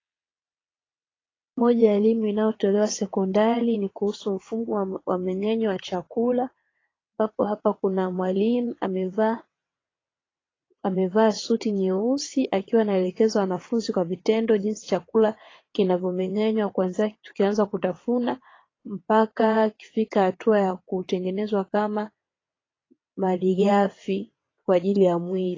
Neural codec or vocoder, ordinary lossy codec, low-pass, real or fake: vocoder, 22.05 kHz, 80 mel bands, WaveNeXt; AAC, 32 kbps; 7.2 kHz; fake